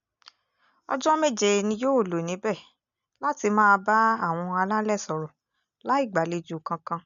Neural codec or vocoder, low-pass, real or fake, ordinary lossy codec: none; 7.2 kHz; real; none